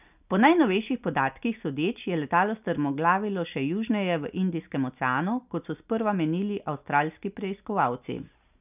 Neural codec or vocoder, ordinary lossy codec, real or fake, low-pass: none; none; real; 3.6 kHz